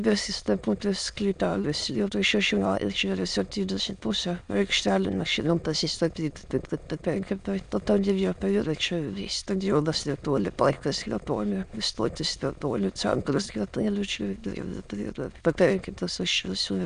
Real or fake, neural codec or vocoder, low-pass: fake; autoencoder, 22.05 kHz, a latent of 192 numbers a frame, VITS, trained on many speakers; 9.9 kHz